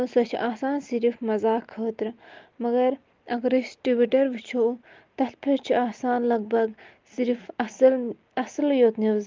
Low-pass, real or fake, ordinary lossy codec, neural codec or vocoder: 7.2 kHz; real; Opus, 32 kbps; none